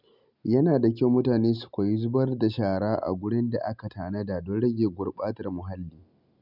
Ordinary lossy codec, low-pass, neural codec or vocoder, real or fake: none; 5.4 kHz; none; real